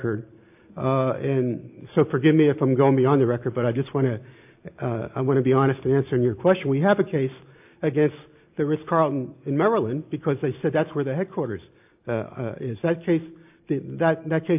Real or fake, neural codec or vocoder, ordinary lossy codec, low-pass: real; none; AAC, 32 kbps; 3.6 kHz